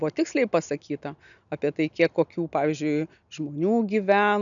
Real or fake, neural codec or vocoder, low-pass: real; none; 7.2 kHz